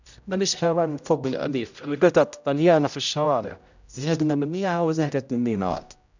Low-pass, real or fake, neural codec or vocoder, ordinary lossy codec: 7.2 kHz; fake; codec, 16 kHz, 0.5 kbps, X-Codec, HuBERT features, trained on general audio; none